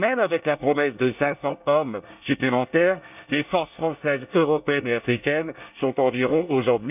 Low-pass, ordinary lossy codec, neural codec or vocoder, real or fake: 3.6 kHz; none; codec, 24 kHz, 1 kbps, SNAC; fake